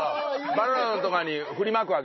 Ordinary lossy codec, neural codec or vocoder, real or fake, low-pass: MP3, 24 kbps; none; real; 7.2 kHz